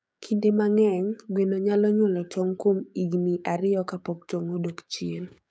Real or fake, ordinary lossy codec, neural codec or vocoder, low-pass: fake; none; codec, 16 kHz, 6 kbps, DAC; none